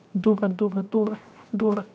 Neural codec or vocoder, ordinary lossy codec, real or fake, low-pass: codec, 16 kHz, 0.7 kbps, FocalCodec; none; fake; none